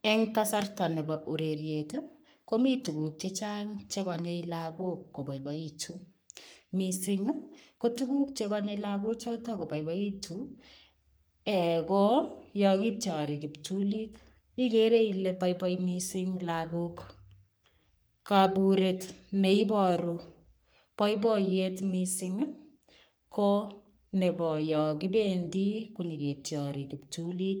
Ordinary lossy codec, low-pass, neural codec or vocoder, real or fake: none; none; codec, 44.1 kHz, 3.4 kbps, Pupu-Codec; fake